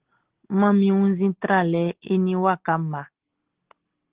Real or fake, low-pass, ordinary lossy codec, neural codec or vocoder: real; 3.6 kHz; Opus, 16 kbps; none